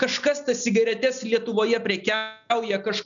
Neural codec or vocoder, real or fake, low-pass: none; real; 7.2 kHz